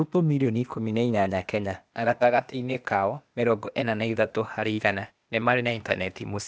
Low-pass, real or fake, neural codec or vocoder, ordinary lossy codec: none; fake; codec, 16 kHz, 0.8 kbps, ZipCodec; none